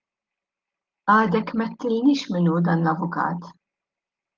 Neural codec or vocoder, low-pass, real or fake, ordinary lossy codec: none; 7.2 kHz; real; Opus, 32 kbps